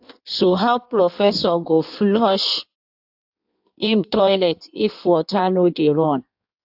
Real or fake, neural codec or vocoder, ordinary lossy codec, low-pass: fake; codec, 16 kHz in and 24 kHz out, 1.1 kbps, FireRedTTS-2 codec; AAC, 48 kbps; 5.4 kHz